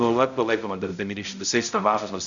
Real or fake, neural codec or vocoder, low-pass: fake; codec, 16 kHz, 0.5 kbps, X-Codec, HuBERT features, trained on balanced general audio; 7.2 kHz